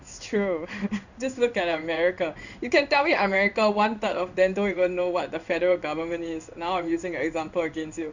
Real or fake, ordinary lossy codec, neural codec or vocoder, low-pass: fake; none; codec, 16 kHz in and 24 kHz out, 1 kbps, XY-Tokenizer; 7.2 kHz